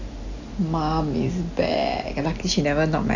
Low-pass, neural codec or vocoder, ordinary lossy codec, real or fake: 7.2 kHz; none; none; real